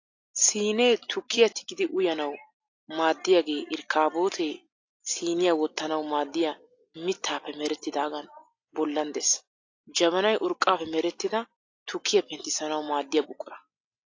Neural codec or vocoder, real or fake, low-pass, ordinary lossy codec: none; real; 7.2 kHz; AAC, 48 kbps